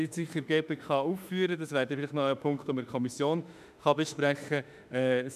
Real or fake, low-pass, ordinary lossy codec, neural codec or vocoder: fake; 14.4 kHz; none; autoencoder, 48 kHz, 32 numbers a frame, DAC-VAE, trained on Japanese speech